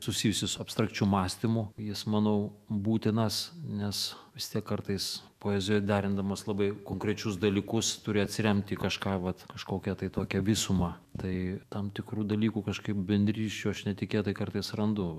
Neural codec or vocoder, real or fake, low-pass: autoencoder, 48 kHz, 128 numbers a frame, DAC-VAE, trained on Japanese speech; fake; 14.4 kHz